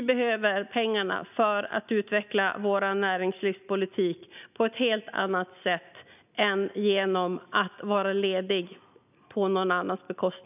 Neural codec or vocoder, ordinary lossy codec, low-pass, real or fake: none; none; 3.6 kHz; real